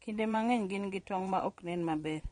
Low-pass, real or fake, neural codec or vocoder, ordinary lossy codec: 9.9 kHz; fake; vocoder, 22.05 kHz, 80 mel bands, WaveNeXt; MP3, 48 kbps